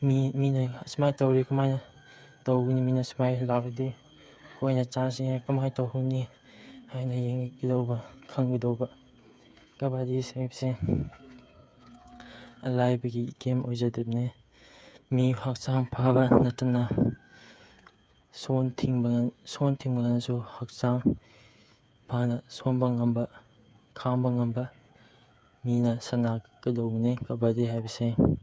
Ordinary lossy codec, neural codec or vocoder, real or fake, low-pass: none; codec, 16 kHz, 8 kbps, FreqCodec, smaller model; fake; none